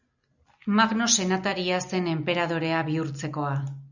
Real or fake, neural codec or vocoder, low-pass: real; none; 7.2 kHz